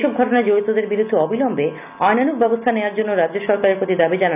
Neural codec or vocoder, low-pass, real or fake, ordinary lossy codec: none; 3.6 kHz; real; none